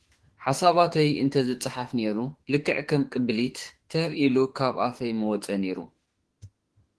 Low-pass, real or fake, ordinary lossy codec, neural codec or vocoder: 10.8 kHz; fake; Opus, 16 kbps; autoencoder, 48 kHz, 32 numbers a frame, DAC-VAE, trained on Japanese speech